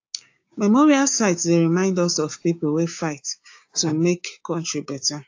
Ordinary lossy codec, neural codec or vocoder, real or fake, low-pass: AAC, 48 kbps; codec, 16 kHz, 6 kbps, DAC; fake; 7.2 kHz